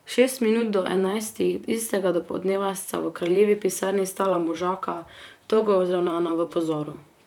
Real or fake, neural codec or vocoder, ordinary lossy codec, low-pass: fake; vocoder, 44.1 kHz, 128 mel bands, Pupu-Vocoder; none; 19.8 kHz